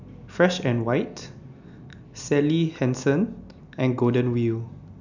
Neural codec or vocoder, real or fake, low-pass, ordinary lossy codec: none; real; 7.2 kHz; none